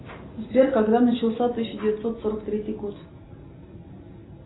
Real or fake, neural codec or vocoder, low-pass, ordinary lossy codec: real; none; 7.2 kHz; AAC, 16 kbps